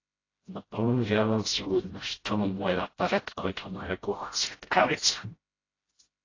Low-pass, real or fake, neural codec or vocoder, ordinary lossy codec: 7.2 kHz; fake; codec, 16 kHz, 0.5 kbps, FreqCodec, smaller model; AAC, 32 kbps